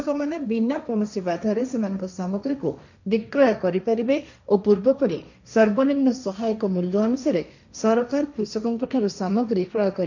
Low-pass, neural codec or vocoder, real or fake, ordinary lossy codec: 7.2 kHz; codec, 16 kHz, 1.1 kbps, Voila-Tokenizer; fake; none